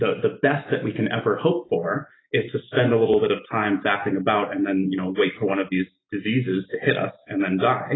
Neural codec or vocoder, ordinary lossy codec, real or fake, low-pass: none; AAC, 16 kbps; real; 7.2 kHz